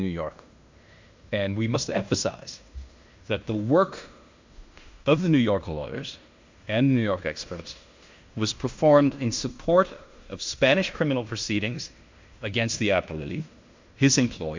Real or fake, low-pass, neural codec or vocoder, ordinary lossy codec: fake; 7.2 kHz; codec, 16 kHz in and 24 kHz out, 0.9 kbps, LongCat-Audio-Codec, fine tuned four codebook decoder; MP3, 64 kbps